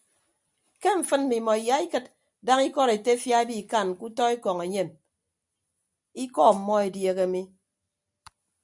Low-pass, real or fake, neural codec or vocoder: 10.8 kHz; real; none